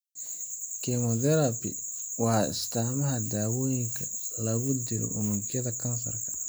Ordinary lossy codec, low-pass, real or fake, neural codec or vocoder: none; none; real; none